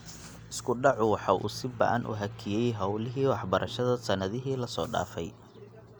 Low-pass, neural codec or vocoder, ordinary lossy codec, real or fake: none; none; none; real